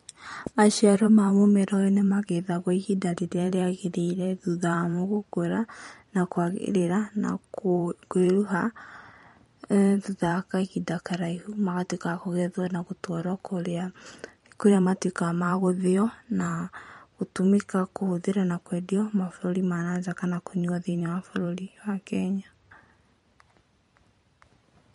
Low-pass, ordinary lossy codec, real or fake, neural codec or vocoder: 19.8 kHz; MP3, 48 kbps; fake; vocoder, 44.1 kHz, 128 mel bands every 512 samples, BigVGAN v2